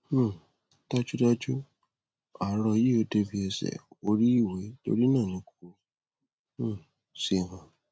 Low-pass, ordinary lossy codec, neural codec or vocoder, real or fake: none; none; none; real